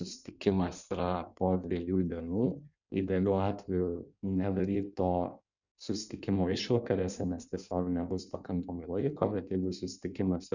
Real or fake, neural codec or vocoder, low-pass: fake; codec, 16 kHz in and 24 kHz out, 1.1 kbps, FireRedTTS-2 codec; 7.2 kHz